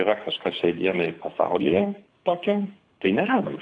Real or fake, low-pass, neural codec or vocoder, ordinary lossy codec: fake; 9.9 kHz; codec, 24 kHz, 6 kbps, HILCodec; AAC, 48 kbps